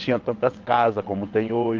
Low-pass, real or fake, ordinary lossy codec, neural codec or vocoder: 7.2 kHz; fake; Opus, 16 kbps; vocoder, 22.05 kHz, 80 mel bands, WaveNeXt